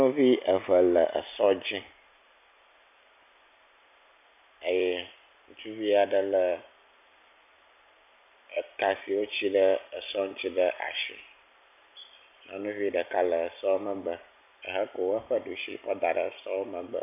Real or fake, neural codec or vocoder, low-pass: real; none; 3.6 kHz